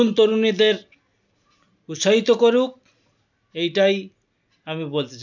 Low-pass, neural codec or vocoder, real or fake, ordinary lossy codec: 7.2 kHz; none; real; none